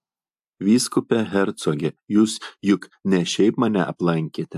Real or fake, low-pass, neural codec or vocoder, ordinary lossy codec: real; 14.4 kHz; none; AAC, 96 kbps